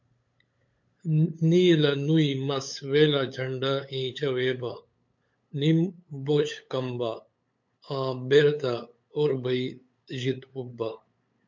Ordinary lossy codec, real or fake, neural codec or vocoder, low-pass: MP3, 48 kbps; fake; codec, 16 kHz, 8 kbps, FunCodec, trained on LibriTTS, 25 frames a second; 7.2 kHz